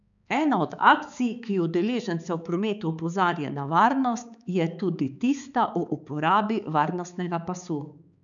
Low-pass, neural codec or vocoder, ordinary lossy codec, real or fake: 7.2 kHz; codec, 16 kHz, 4 kbps, X-Codec, HuBERT features, trained on balanced general audio; none; fake